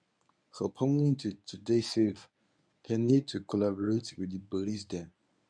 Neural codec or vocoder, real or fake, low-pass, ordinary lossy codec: codec, 24 kHz, 0.9 kbps, WavTokenizer, medium speech release version 1; fake; 9.9 kHz; none